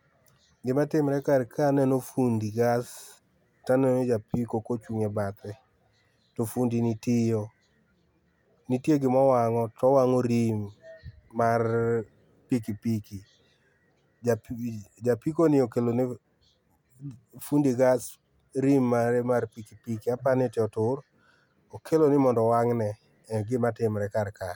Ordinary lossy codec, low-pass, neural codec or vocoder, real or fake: none; 19.8 kHz; none; real